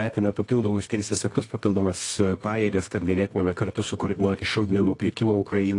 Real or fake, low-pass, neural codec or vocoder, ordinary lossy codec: fake; 10.8 kHz; codec, 24 kHz, 0.9 kbps, WavTokenizer, medium music audio release; AAC, 48 kbps